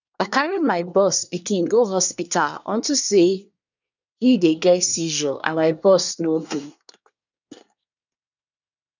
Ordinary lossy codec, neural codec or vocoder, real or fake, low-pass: none; codec, 24 kHz, 1 kbps, SNAC; fake; 7.2 kHz